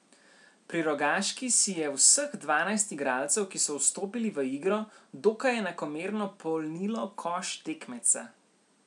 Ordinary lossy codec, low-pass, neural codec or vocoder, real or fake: MP3, 96 kbps; 10.8 kHz; none; real